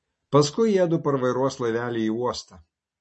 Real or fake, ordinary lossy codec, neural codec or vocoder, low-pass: real; MP3, 32 kbps; none; 9.9 kHz